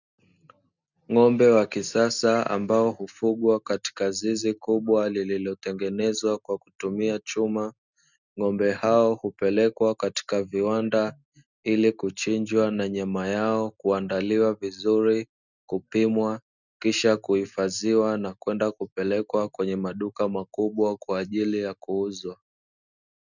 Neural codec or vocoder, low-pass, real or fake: none; 7.2 kHz; real